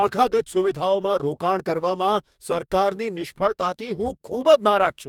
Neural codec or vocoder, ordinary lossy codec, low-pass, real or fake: codec, 44.1 kHz, 2.6 kbps, DAC; none; 19.8 kHz; fake